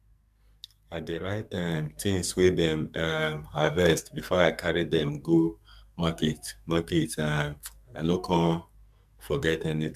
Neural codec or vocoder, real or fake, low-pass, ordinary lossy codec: codec, 44.1 kHz, 2.6 kbps, SNAC; fake; 14.4 kHz; MP3, 96 kbps